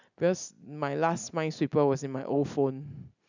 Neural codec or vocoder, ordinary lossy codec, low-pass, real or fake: none; none; 7.2 kHz; real